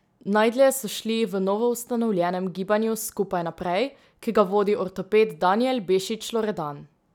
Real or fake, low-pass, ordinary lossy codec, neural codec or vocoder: real; 19.8 kHz; none; none